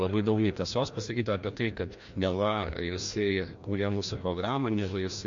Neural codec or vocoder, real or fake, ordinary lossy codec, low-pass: codec, 16 kHz, 1 kbps, FreqCodec, larger model; fake; MP3, 48 kbps; 7.2 kHz